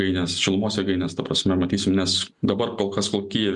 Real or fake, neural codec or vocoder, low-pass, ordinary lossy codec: real; none; 10.8 kHz; MP3, 96 kbps